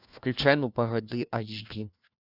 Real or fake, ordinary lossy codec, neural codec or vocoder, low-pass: fake; Opus, 64 kbps; codec, 16 kHz, 1 kbps, FunCodec, trained on LibriTTS, 50 frames a second; 5.4 kHz